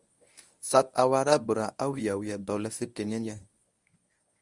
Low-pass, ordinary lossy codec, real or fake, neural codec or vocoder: 10.8 kHz; Opus, 64 kbps; fake; codec, 24 kHz, 0.9 kbps, WavTokenizer, medium speech release version 1